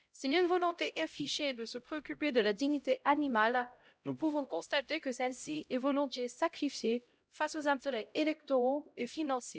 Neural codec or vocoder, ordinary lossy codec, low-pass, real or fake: codec, 16 kHz, 0.5 kbps, X-Codec, HuBERT features, trained on LibriSpeech; none; none; fake